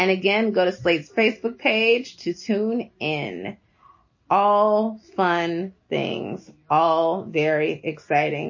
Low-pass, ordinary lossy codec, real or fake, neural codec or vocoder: 7.2 kHz; MP3, 32 kbps; real; none